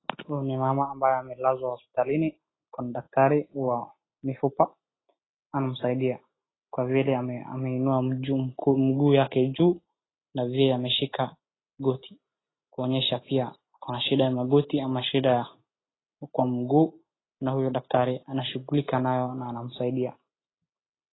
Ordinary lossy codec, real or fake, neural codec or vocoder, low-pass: AAC, 16 kbps; real; none; 7.2 kHz